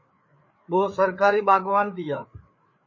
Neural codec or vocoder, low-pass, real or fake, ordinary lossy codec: codec, 16 kHz, 4 kbps, FreqCodec, larger model; 7.2 kHz; fake; MP3, 32 kbps